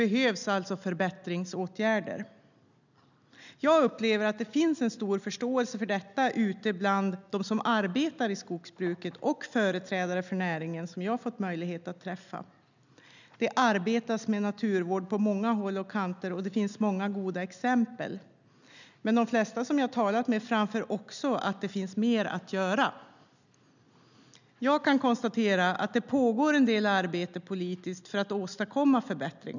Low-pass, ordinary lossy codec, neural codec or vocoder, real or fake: 7.2 kHz; none; none; real